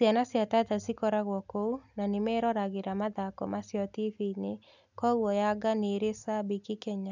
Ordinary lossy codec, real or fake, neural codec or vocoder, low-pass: none; real; none; 7.2 kHz